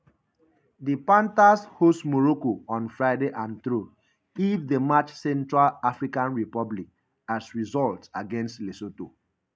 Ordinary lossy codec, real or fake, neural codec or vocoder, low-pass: none; real; none; none